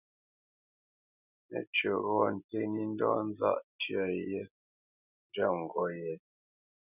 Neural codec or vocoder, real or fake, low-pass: none; real; 3.6 kHz